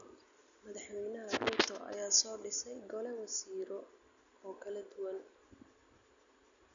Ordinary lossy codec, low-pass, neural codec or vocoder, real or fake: none; 7.2 kHz; none; real